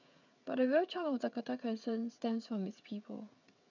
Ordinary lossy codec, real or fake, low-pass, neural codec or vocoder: none; fake; 7.2 kHz; codec, 16 kHz, 16 kbps, FreqCodec, smaller model